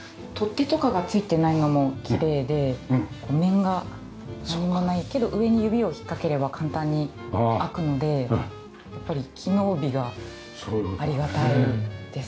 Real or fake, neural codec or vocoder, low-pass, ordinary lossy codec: real; none; none; none